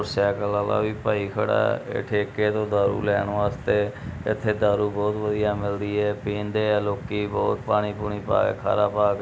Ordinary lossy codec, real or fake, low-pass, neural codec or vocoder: none; real; none; none